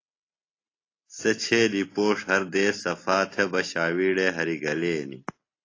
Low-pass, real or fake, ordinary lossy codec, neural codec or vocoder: 7.2 kHz; real; AAC, 32 kbps; none